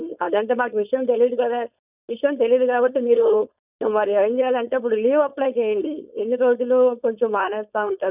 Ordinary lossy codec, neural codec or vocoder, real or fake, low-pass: none; codec, 16 kHz, 4.8 kbps, FACodec; fake; 3.6 kHz